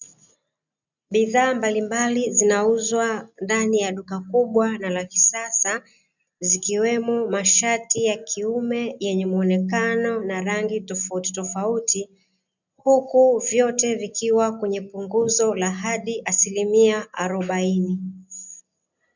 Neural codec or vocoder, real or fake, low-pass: none; real; 7.2 kHz